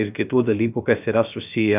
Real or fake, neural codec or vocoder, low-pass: fake; codec, 16 kHz, 0.3 kbps, FocalCodec; 3.6 kHz